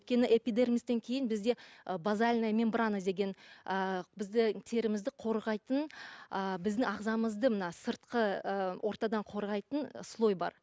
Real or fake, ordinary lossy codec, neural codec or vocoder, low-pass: real; none; none; none